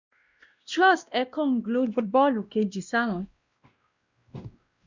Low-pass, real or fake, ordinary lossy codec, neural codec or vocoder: 7.2 kHz; fake; Opus, 64 kbps; codec, 16 kHz, 1 kbps, X-Codec, WavLM features, trained on Multilingual LibriSpeech